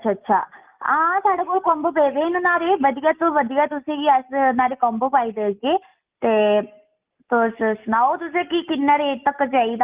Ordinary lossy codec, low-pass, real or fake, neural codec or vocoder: Opus, 32 kbps; 3.6 kHz; real; none